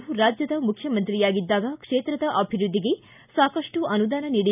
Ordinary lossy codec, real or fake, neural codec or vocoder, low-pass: none; real; none; 3.6 kHz